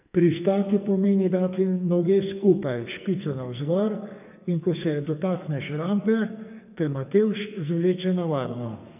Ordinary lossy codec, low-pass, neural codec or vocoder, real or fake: none; 3.6 kHz; codec, 44.1 kHz, 2.6 kbps, SNAC; fake